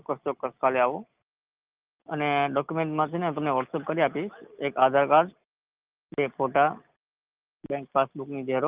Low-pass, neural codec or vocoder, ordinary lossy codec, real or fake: 3.6 kHz; none; Opus, 32 kbps; real